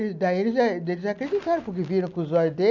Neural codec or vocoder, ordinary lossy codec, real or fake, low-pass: none; none; real; 7.2 kHz